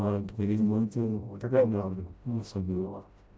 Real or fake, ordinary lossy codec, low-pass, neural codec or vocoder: fake; none; none; codec, 16 kHz, 0.5 kbps, FreqCodec, smaller model